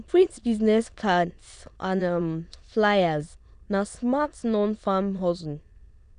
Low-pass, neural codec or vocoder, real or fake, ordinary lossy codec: 9.9 kHz; autoencoder, 22.05 kHz, a latent of 192 numbers a frame, VITS, trained on many speakers; fake; none